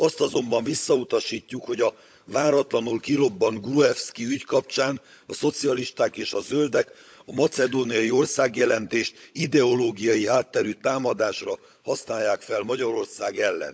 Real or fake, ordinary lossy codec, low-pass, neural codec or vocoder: fake; none; none; codec, 16 kHz, 16 kbps, FunCodec, trained on LibriTTS, 50 frames a second